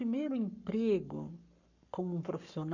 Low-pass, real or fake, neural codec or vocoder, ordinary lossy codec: 7.2 kHz; fake; codec, 44.1 kHz, 7.8 kbps, Pupu-Codec; none